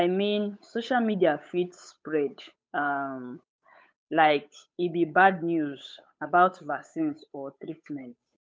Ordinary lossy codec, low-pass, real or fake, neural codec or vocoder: none; none; fake; codec, 16 kHz, 8 kbps, FunCodec, trained on Chinese and English, 25 frames a second